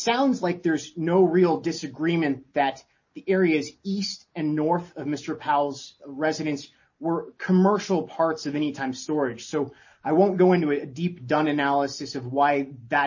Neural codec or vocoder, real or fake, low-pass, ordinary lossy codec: none; real; 7.2 kHz; MP3, 32 kbps